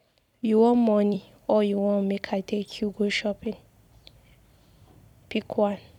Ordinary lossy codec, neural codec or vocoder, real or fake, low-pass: none; vocoder, 44.1 kHz, 128 mel bands every 256 samples, BigVGAN v2; fake; 19.8 kHz